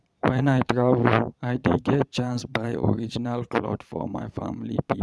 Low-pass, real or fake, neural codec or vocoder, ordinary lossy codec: none; fake; vocoder, 22.05 kHz, 80 mel bands, Vocos; none